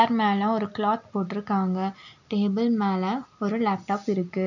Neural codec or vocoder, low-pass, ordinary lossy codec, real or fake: none; 7.2 kHz; none; real